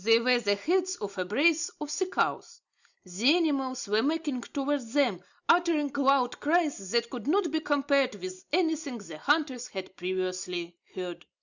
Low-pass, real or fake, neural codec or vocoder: 7.2 kHz; real; none